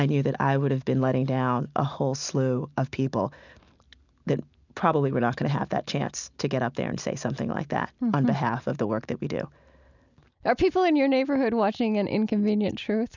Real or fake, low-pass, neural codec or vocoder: real; 7.2 kHz; none